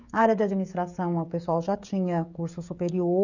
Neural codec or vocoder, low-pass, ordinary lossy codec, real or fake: codec, 16 kHz, 16 kbps, FreqCodec, smaller model; 7.2 kHz; none; fake